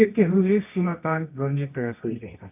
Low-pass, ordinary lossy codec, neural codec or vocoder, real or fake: 3.6 kHz; none; codec, 24 kHz, 0.9 kbps, WavTokenizer, medium music audio release; fake